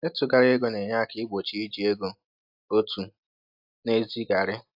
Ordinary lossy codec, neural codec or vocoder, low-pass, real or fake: AAC, 48 kbps; none; 5.4 kHz; real